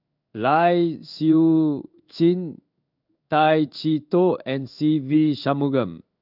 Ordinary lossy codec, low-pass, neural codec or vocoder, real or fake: none; 5.4 kHz; codec, 16 kHz in and 24 kHz out, 1 kbps, XY-Tokenizer; fake